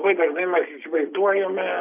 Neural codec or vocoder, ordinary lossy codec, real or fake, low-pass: none; AAC, 32 kbps; real; 3.6 kHz